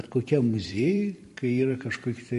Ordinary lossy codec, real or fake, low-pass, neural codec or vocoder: MP3, 48 kbps; fake; 14.4 kHz; vocoder, 44.1 kHz, 128 mel bands every 512 samples, BigVGAN v2